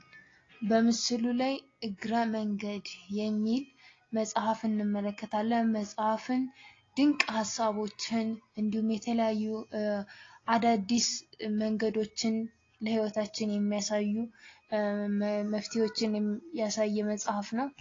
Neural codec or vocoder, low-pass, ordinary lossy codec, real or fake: none; 7.2 kHz; AAC, 32 kbps; real